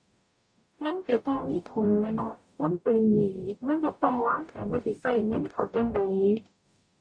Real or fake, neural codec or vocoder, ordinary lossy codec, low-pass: fake; codec, 44.1 kHz, 0.9 kbps, DAC; none; 9.9 kHz